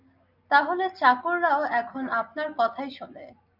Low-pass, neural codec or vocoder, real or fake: 5.4 kHz; none; real